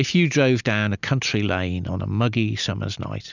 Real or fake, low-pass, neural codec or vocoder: real; 7.2 kHz; none